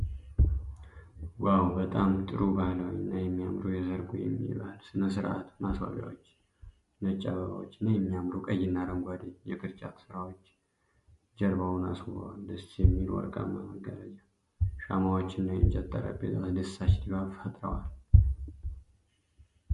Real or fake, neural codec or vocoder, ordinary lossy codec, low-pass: real; none; MP3, 48 kbps; 14.4 kHz